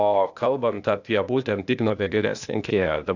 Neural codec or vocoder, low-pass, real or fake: codec, 16 kHz, 0.8 kbps, ZipCodec; 7.2 kHz; fake